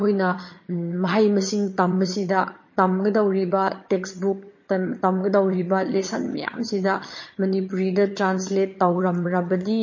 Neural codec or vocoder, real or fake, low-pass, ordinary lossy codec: vocoder, 22.05 kHz, 80 mel bands, HiFi-GAN; fake; 7.2 kHz; MP3, 32 kbps